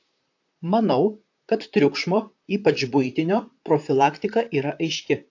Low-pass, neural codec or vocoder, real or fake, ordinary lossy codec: 7.2 kHz; vocoder, 44.1 kHz, 128 mel bands, Pupu-Vocoder; fake; AAC, 48 kbps